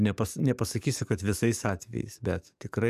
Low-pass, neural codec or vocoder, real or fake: 14.4 kHz; codec, 44.1 kHz, 7.8 kbps, DAC; fake